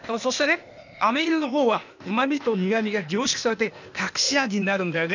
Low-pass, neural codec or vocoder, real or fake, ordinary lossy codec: 7.2 kHz; codec, 16 kHz, 0.8 kbps, ZipCodec; fake; none